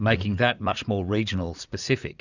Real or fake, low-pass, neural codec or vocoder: fake; 7.2 kHz; vocoder, 44.1 kHz, 80 mel bands, Vocos